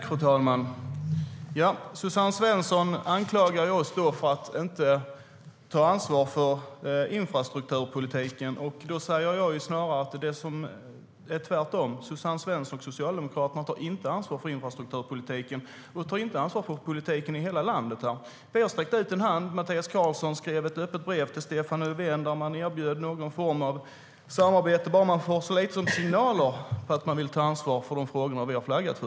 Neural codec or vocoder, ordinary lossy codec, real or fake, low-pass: none; none; real; none